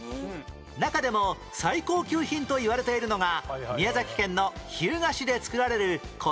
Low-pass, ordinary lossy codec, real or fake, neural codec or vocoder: none; none; real; none